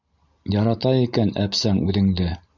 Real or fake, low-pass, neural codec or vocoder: real; 7.2 kHz; none